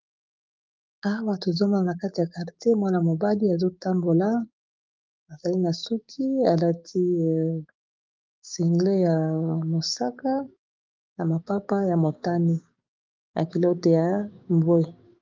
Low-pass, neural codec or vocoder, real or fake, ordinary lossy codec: 7.2 kHz; codec, 16 kHz, 6 kbps, DAC; fake; Opus, 24 kbps